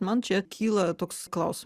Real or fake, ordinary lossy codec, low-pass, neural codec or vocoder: real; Opus, 64 kbps; 14.4 kHz; none